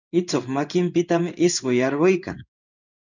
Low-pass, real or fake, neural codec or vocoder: 7.2 kHz; fake; codec, 16 kHz in and 24 kHz out, 1 kbps, XY-Tokenizer